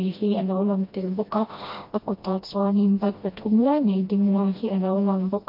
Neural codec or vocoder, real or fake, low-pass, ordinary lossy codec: codec, 16 kHz, 1 kbps, FreqCodec, smaller model; fake; 5.4 kHz; MP3, 32 kbps